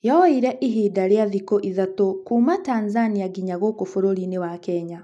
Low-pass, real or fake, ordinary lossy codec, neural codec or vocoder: none; real; none; none